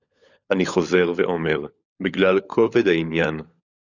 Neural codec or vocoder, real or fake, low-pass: codec, 16 kHz, 16 kbps, FunCodec, trained on LibriTTS, 50 frames a second; fake; 7.2 kHz